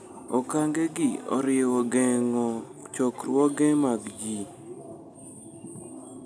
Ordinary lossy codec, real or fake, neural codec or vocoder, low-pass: none; real; none; none